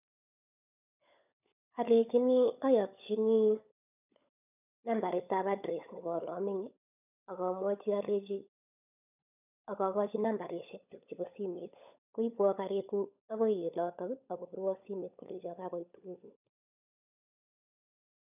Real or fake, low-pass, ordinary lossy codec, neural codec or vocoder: fake; 3.6 kHz; none; codec, 16 kHz, 16 kbps, FunCodec, trained on LibriTTS, 50 frames a second